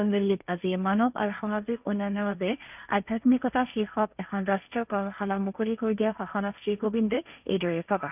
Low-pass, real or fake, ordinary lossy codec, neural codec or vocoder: 3.6 kHz; fake; none; codec, 16 kHz, 1.1 kbps, Voila-Tokenizer